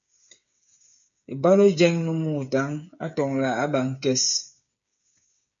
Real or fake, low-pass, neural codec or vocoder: fake; 7.2 kHz; codec, 16 kHz, 8 kbps, FreqCodec, smaller model